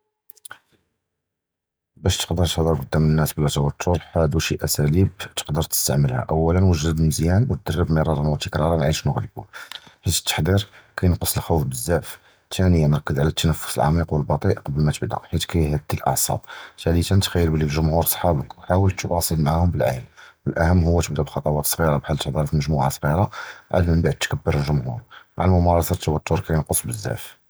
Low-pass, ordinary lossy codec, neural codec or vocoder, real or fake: none; none; none; real